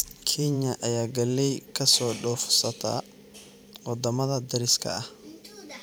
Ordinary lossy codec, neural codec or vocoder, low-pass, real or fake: none; vocoder, 44.1 kHz, 128 mel bands every 512 samples, BigVGAN v2; none; fake